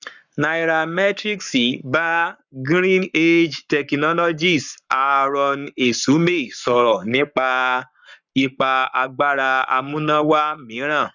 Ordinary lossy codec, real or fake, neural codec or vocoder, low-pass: none; fake; codec, 44.1 kHz, 7.8 kbps, Pupu-Codec; 7.2 kHz